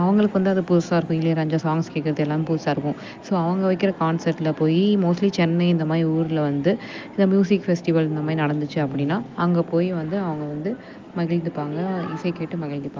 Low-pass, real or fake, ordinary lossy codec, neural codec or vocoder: 7.2 kHz; real; Opus, 32 kbps; none